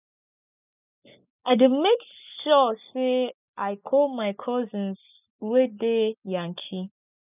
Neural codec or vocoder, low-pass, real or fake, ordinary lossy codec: none; 3.6 kHz; real; none